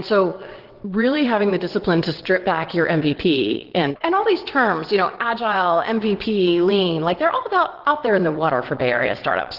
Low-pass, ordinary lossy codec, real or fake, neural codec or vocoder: 5.4 kHz; Opus, 16 kbps; fake; vocoder, 22.05 kHz, 80 mel bands, WaveNeXt